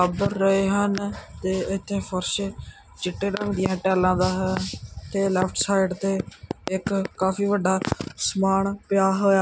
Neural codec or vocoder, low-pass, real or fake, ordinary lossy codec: none; none; real; none